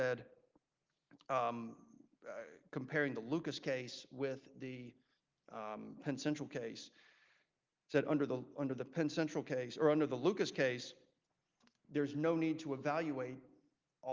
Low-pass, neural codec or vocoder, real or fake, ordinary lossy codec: 7.2 kHz; none; real; Opus, 32 kbps